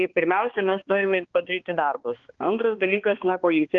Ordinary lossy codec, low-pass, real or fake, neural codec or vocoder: Opus, 16 kbps; 7.2 kHz; fake; codec, 16 kHz, 2 kbps, X-Codec, HuBERT features, trained on balanced general audio